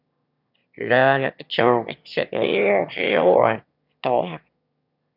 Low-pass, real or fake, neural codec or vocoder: 5.4 kHz; fake; autoencoder, 22.05 kHz, a latent of 192 numbers a frame, VITS, trained on one speaker